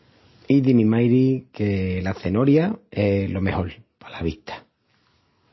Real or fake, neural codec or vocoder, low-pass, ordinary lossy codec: real; none; 7.2 kHz; MP3, 24 kbps